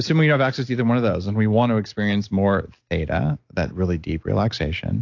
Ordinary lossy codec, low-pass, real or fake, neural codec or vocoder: AAC, 48 kbps; 7.2 kHz; real; none